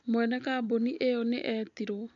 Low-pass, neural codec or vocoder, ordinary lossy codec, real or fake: 7.2 kHz; none; none; real